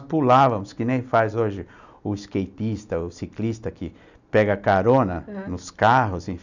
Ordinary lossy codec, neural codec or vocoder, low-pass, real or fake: none; none; 7.2 kHz; real